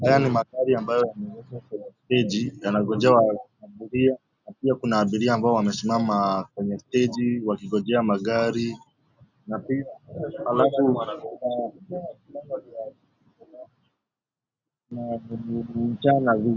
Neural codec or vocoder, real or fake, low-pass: none; real; 7.2 kHz